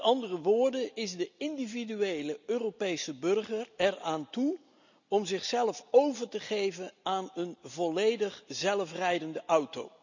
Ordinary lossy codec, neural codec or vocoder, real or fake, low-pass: none; none; real; 7.2 kHz